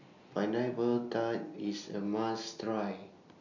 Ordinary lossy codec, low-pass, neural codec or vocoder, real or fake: none; 7.2 kHz; none; real